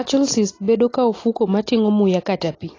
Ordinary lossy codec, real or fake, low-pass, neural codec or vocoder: AAC, 32 kbps; real; 7.2 kHz; none